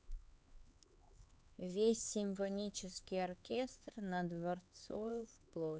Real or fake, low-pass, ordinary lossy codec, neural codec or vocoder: fake; none; none; codec, 16 kHz, 4 kbps, X-Codec, HuBERT features, trained on LibriSpeech